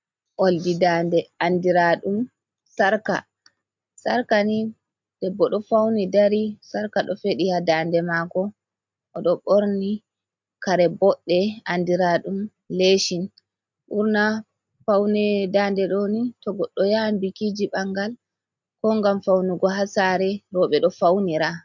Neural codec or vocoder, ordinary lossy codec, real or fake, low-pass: none; MP3, 64 kbps; real; 7.2 kHz